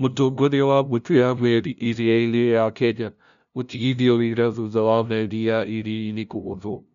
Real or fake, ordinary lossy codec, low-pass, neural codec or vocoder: fake; none; 7.2 kHz; codec, 16 kHz, 0.5 kbps, FunCodec, trained on LibriTTS, 25 frames a second